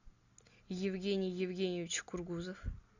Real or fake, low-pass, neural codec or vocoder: real; 7.2 kHz; none